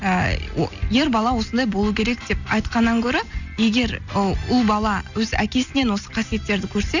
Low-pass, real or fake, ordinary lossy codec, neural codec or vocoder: 7.2 kHz; real; none; none